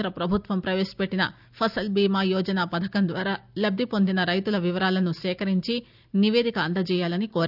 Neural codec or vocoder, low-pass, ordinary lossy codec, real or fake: none; 5.4 kHz; none; real